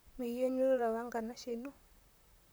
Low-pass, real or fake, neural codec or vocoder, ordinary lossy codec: none; fake; vocoder, 44.1 kHz, 128 mel bands, Pupu-Vocoder; none